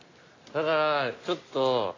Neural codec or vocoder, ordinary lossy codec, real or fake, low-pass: none; AAC, 32 kbps; real; 7.2 kHz